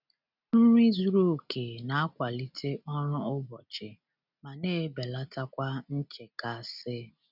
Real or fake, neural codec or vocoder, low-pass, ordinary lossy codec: real; none; 5.4 kHz; none